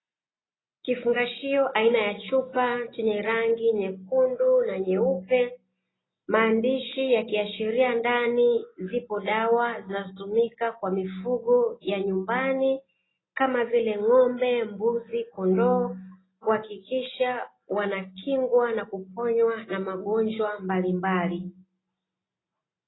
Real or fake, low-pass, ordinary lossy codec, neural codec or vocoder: real; 7.2 kHz; AAC, 16 kbps; none